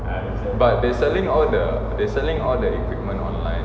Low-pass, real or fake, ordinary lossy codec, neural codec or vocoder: none; real; none; none